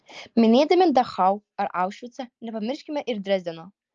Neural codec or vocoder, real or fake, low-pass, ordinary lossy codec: none; real; 7.2 kHz; Opus, 24 kbps